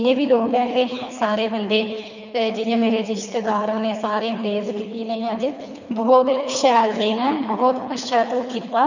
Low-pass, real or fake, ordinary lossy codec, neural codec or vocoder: 7.2 kHz; fake; none; codec, 24 kHz, 3 kbps, HILCodec